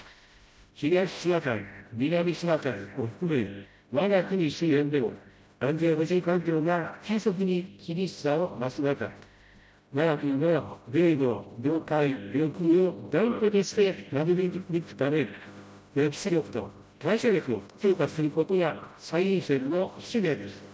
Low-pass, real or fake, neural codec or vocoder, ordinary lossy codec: none; fake; codec, 16 kHz, 0.5 kbps, FreqCodec, smaller model; none